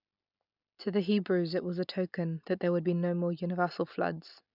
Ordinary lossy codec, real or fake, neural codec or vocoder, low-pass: none; real; none; 5.4 kHz